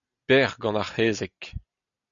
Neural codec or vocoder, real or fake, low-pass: none; real; 7.2 kHz